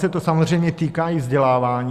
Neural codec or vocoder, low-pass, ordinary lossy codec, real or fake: none; 14.4 kHz; Opus, 64 kbps; real